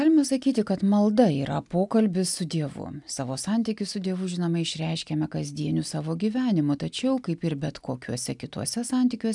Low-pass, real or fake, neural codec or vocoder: 10.8 kHz; real; none